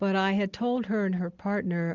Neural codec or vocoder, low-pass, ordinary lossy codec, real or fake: none; 7.2 kHz; Opus, 32 kbps; real